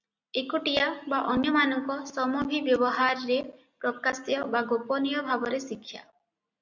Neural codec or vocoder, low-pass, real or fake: none; 7.2 kHz; real